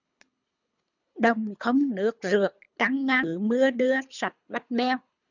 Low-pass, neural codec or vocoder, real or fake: 7.2 kHz; codec, 24 kHz, 3 kbps, HILCodec; fake